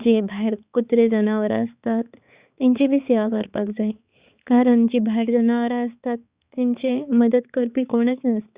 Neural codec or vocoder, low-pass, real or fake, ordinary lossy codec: codec, 16 kHz, 4 kbps, X-Codec, HuBERT features, trained on balanced general audio; 3.6 kHz; fake; Opus, 64 kbps